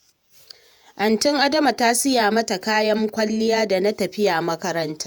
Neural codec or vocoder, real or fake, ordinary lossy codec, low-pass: vocoder, 48 kHz, 128 mel bands, Vocos; fake; none; none